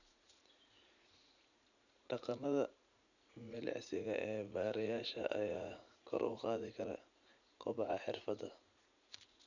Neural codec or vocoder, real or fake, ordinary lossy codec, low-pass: vocoder, 44.1 kHz, 80 mel bands, Vocos; fake; none; 7.2 kHz